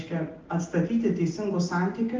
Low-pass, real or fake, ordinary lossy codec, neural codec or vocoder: 7.2 kHz; real; Opus, 24 kbps; none